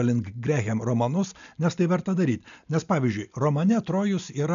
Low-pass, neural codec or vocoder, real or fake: 7.2 kHz; none; real